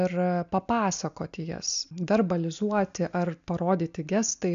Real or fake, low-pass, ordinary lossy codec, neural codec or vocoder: real; 7.2 kHz; MP3, 64 kbps; none